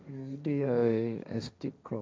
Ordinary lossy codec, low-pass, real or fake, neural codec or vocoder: none; none; fake; codec, 16 kHz, 1.1 kbps, Voila-Tokenizer